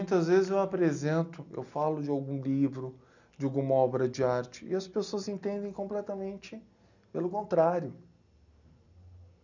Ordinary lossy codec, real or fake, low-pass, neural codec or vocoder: none; real; 7.2 kHz; none